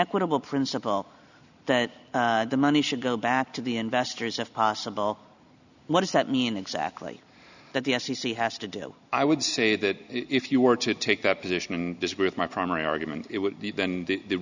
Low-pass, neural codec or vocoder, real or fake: 7.2 kHz; none; real